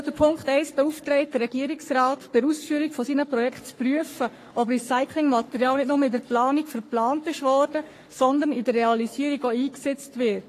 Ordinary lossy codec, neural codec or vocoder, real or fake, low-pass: AAC, 48 kbps; codec, 44.1 kHz, 3.4 kbps, Pupu-Codec; fake; 14.4 kHz